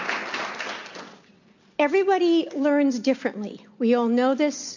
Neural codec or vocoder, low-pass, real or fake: none; 7.2 kHz; real